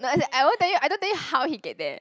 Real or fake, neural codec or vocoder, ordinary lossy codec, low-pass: real; none; none; none